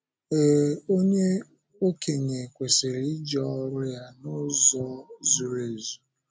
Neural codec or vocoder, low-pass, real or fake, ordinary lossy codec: none; none; real; none